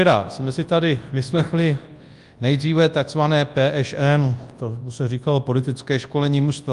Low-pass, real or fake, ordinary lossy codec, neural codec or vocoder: 10.8 kHz; fake; Opus, 32 kbps; codec, 24 kHz, 0.9 kbps, WavTokenizer, large speech release